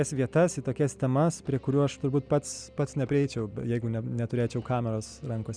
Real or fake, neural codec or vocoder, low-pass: real; none; 9.9 kHz